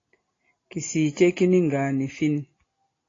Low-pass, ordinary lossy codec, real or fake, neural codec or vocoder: 7.2 kHz; AAC, 32 kbps; real; none